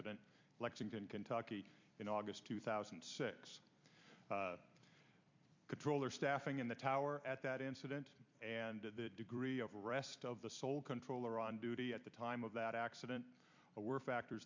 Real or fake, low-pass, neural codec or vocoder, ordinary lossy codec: fake; 7.2 kHz; vocoder, 44.1 kHz, 128 mel bands every 256 samples, BigVGAN v2; MP3, 64 kbps